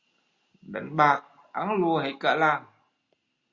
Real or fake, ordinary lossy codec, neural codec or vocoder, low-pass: real; Opus, 64 kbps; none; 7.2 kHz